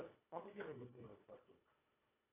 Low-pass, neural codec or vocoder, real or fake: 3.6 kHz; codec, 24 kHz, 1.5 kbps, HILCodec; fake